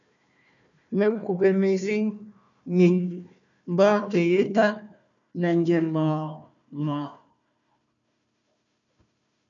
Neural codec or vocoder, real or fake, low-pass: codec, 16 kHz, 1 kbps, FunCodec, trained on Chinese and English, 50 frames a second; fake; 7.2 kHz